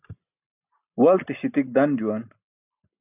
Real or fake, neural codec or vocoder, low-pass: real; none; 3.6 kHz